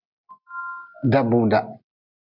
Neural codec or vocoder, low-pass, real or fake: none; 5.4 kHz; real